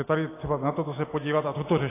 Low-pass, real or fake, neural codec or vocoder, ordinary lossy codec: 3.6 kHz; real; none; AAC, 16 kbps